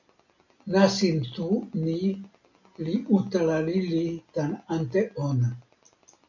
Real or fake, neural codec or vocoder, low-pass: real; none; 7.2 kHz